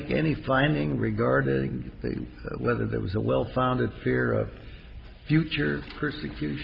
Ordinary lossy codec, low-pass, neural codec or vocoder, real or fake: Opus, 32 kbps; 5.4 kHz; none; real